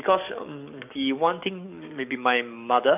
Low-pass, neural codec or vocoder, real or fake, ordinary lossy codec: 3.6 kHz; codec, 44.1 kHz, 7.8 kbps, DAC; fake; none